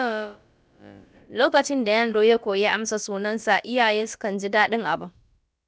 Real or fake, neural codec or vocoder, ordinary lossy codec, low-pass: fake; codec, 16 kHz, about 1 kbps, DyCAST, with the encoder's durations; none; none